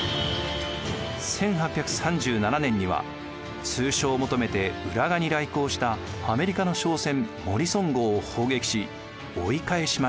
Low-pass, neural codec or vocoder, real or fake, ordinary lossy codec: none; none; real; none